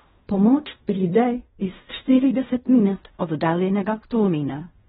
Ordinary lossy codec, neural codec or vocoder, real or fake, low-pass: AAC, 16 kbps; codec, 16 kHz in and 24 kHz out, 0.4 kbps, LongCat-Audio-Codec, fine tuned four codebook decoder; fake; 10.8 kHz